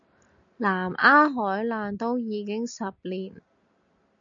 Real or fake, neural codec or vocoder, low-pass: real; none; 7.2 kHz